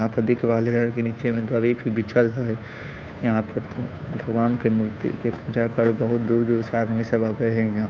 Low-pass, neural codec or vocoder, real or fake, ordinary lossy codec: none; codec, 16 kHz, 2 kbps, FunCodec, trained on Chinese and English, 25 frames a second; fake; none